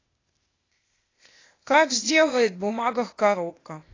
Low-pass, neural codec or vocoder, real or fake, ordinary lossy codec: 7.2 kHz; codec, 16 kHz, 0.8 kbps, ZipCodec; fake; AAC, 32 kbps